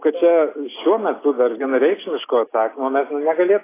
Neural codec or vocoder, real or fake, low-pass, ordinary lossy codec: none; real; 3.6 kHz; AAC, 16 kbps